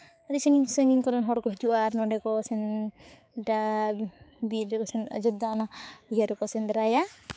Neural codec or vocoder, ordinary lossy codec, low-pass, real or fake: codec, 16 kHz, 4 kbps, X-Codec, HuBERT features, trained on balanced general audio; none; none; fake